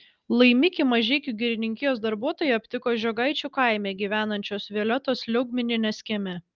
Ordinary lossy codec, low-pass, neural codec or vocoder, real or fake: Opus, 24 kbps; 7.2 kHz; none; real